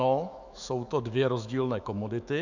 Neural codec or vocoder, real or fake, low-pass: autoencoder, 48 kHz, 128 numbers a frame, DAC-VAE, trained on Japanese speech; fake; 7.2 kHz